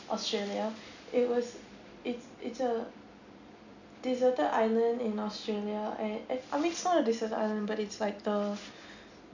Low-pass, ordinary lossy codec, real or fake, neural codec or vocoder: 7.2 kHz; none; real; none